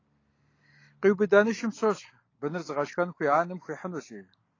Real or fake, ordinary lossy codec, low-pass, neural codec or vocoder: real; AAC, 32 kbps; 7.2 kHz; none